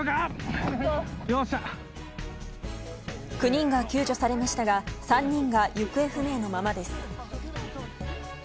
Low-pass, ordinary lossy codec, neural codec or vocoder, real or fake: none; none; none; real